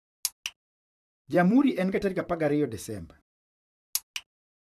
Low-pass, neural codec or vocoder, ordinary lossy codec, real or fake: 14.4 kHz; vocoder, 44.1 kHz, 128 mel bands, Pupu-Vocoder; none; fake